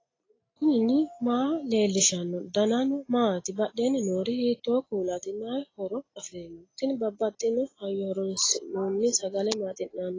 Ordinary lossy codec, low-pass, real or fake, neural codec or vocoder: AAC, 32 kbps; 7.2 kHz; real; none